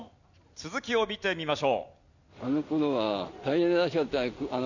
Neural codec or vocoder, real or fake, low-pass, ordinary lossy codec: none; real; 7.2 kHz; none